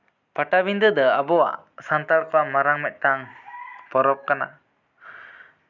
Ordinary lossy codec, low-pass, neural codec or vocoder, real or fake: none; 7.2 kHz; none; real